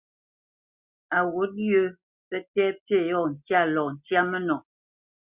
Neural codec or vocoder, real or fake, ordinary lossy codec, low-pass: none; real; Opus, 64 kbps; 3.6 kHz